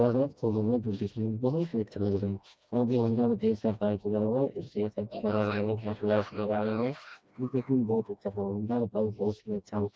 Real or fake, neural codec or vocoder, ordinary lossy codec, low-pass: fake; codec, 16 kHz, 1 kbps, FreqCodec, smaller model; none; none